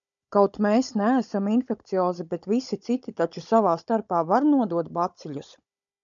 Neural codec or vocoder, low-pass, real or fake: codec, 16 kHz, 16 kbps, FunCodec, trained on Chinese and English, 50 frames a second; 7.2 kHz; fake